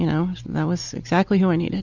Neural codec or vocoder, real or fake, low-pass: none; real; 7.2 kHz